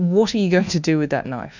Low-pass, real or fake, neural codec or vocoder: 7.2 kHz; fake; codec, 24 kHz, 1.2 kbps, DualCodec